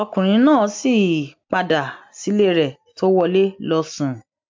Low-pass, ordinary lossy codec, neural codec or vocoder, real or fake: 7.2 kHz; MP3, 64 kbps; none; real